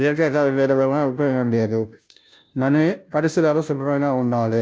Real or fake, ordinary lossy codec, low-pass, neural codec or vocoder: fake; none; none; codec, 16 kHz, 0.5 kbps, FunCodec, trained on Chinese and English, 25 frames a second